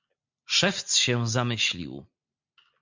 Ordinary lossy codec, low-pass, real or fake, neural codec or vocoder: MP3, 64 kbps; 7.2 kHz; real; none